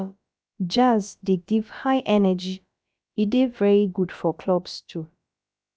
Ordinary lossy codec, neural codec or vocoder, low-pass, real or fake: none; codec, 16 kHz, about 1 kbps, DyCAST, with the encoder's durations; none; fake